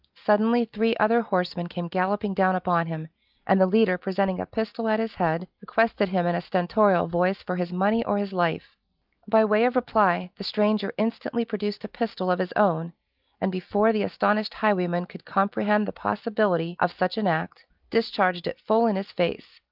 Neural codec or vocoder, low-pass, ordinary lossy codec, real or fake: none; 5.4 kHz; Opus, 24 kbps; real